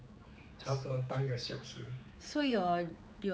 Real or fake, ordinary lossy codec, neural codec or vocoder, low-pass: fake; none; codec, 16 kHz, 4 kbps, X-Codec, HuBERT features, trained on general audio; none